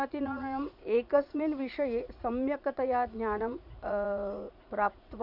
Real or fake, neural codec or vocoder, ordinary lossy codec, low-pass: fake; vocoder, 44.1 kHz, 80 mel bands, Vocos; none; 5.4 kHz